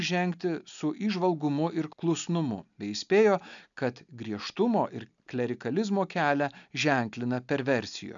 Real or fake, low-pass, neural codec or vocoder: real; 7.2 kHz; none